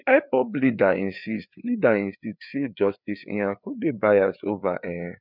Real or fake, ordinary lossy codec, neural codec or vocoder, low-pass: fake; none; codec, 16 kHz, 4 kbps, FreqCodec, larger model; 5.4 kHz